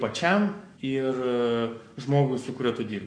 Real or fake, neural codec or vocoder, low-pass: fake; codec, 44.1 kHz, 7.8 kbps, Pupu-Codec; 9.9 kHz